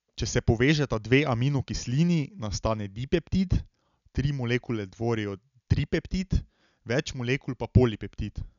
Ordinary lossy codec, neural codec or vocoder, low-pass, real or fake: none; none; 7.2 kHz; real